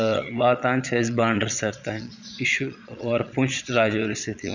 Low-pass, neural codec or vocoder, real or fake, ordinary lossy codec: 7.2 kHz; vocoder, 22.05 kHz, 80 mel bands, Vocos; fake; none